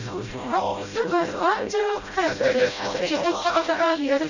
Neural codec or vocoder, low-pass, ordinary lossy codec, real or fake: codec, 16 kHz, 0.5 kbps, FreqCodec, smaller model; 7.2 kHz; none; fake